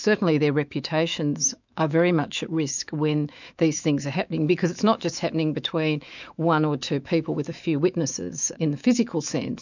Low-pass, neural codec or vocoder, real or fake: 7.2 kHz; autoencoder, 48 kHz, 128 numbers a frame, DAC-VAE, trained on Japanese speech; fake